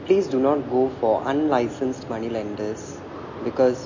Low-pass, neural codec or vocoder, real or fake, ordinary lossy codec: 7.2 kHz; none; real; MP3, 32 kbps